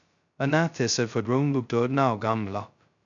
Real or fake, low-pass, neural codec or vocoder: fake; 7.2 kHz; codec, 16 kHz, 0.2 kbps, FocalCodec